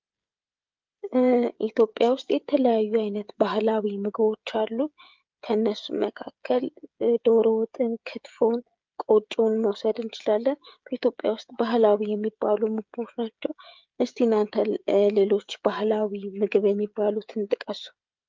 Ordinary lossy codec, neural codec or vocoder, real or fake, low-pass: Opus, 24 kbps; codec, 16 kHz, 16 kbps, FreqCodec, smaller model; fake; 7.2 kHz